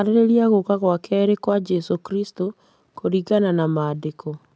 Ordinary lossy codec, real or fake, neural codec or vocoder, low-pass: none; real; none; none